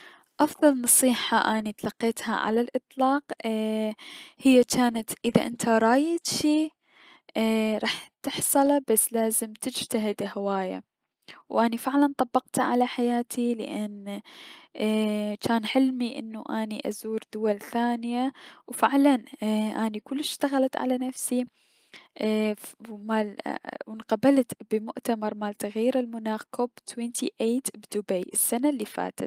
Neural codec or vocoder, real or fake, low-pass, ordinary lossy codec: none; real; 14.4 kHz; Opus, 32 kbps